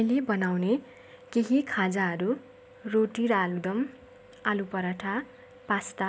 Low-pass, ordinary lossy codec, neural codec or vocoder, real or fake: none; none; none; real